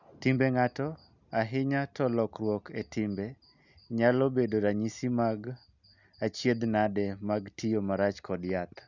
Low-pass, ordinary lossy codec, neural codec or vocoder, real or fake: 7.2 kHz; none; none; real